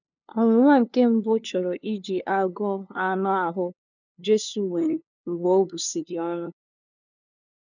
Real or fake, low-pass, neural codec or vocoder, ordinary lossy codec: fake; 7.2 kHz; codec, 16 kHz, 2 kbps, FunCodec, trained on LibriTTS, 25 frames a second; none